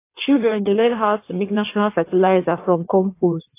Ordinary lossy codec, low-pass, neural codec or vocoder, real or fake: AAC, 24 kbps; 3.6 kHz; codec, 16 kHz in and 24 kHz out, 1.1 kbps, FireRedTTS-2 codec; fake